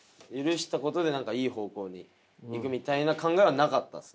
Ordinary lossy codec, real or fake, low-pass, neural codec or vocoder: none; real; none; none